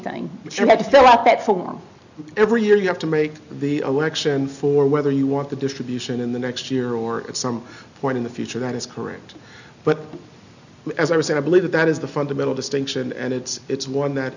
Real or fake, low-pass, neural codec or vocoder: real; 7.2 kHz; none